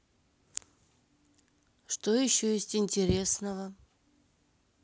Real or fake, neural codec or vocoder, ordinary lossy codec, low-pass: real; none; none; none